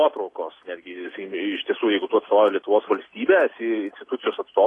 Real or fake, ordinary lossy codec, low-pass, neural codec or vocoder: real; AAC, 32 kbps; 10.8 kHz; none